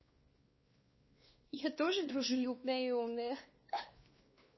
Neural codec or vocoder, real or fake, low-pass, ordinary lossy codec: codec, 16 kHz, 2 kbps, X-Codec, WavLM features, trained on Multilingual LibriSpeech; fake; 7.2 kHz; MP3, 24 kbps